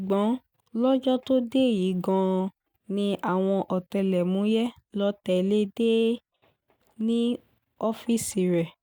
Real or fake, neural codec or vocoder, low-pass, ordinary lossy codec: real; none; 19.8 kHz; none